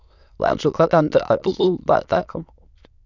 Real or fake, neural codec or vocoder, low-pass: fake; autoencoder, 22.05 kHz, a latent of 192 numbers a frame, VITS, trained on many speakers; 7.2 kHz